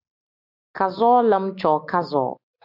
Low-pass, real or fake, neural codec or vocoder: 5.4 kHz; real; none